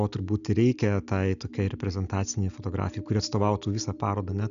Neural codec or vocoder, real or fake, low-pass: none; real; 7.2 kHz